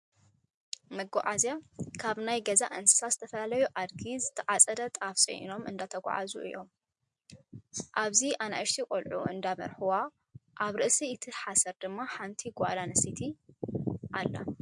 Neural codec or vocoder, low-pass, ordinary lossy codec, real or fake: none; 10.8 kHz; MP3, 64 kbps; real